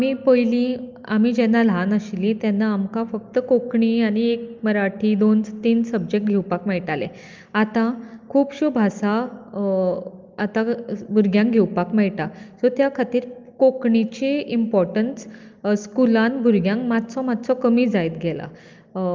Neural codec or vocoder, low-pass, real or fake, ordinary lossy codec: none; 7.2 kHz; real; Opus, 24 kbps